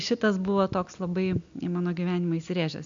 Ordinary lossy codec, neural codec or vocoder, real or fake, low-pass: MP3, 64 kbps; none; real; 7.2 kHz